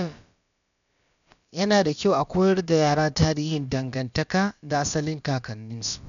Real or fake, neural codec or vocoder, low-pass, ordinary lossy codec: fake; codec, 16 kHz, about 1 kbps, DyCAST, with the encoder's durations; 7.2 kHz; none